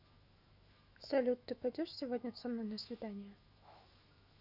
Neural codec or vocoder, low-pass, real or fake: autoencoder, 48 kHz, 128 numbers a frame, DAC-VAE, trained on Japanese speech; 5.4 kHz; fake